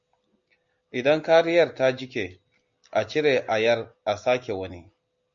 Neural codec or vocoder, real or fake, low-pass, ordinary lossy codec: none; real; 7.2 kHz; MP3, 48 kbps